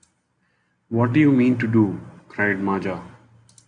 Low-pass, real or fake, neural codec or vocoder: 9.9 kHz; real; none